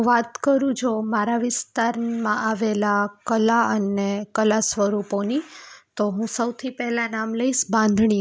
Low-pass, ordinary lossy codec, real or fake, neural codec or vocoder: none; none; real; none